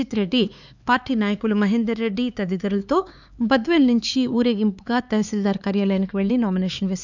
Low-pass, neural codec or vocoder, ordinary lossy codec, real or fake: 7.2 kHz; codec, 16 kHz, 4 kbps, X-Codec, HuBERT features, trained on LibriSpeech; none; fake